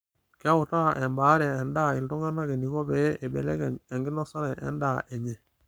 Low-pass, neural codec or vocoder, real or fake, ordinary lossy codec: none; codec, 44.1 kHz, 7.8 kbps, Pupu-Codec; fake; none